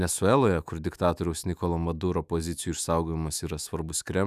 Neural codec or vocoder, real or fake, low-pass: autoencoder, 48 kHz, 128 numbers a frame, DAC-VAE, trained on Japanese speech; fake; 14.4 kHz